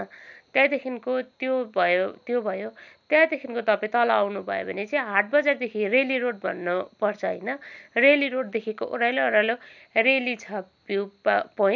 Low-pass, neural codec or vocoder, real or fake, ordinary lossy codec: 7.2 kHz; none; real; none